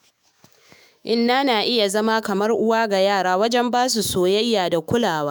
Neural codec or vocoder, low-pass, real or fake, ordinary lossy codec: autoencoder, 48 kHz, 128 numbers a frame, DAC-VAE, trained on Japanese speech; none; fake; none